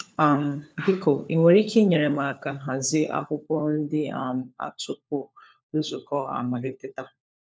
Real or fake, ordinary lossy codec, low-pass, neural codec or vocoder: fake; none; none; codec, 16 kHz, 4 kbps, FunCodec, trained on LibriTTS, 50 frames a second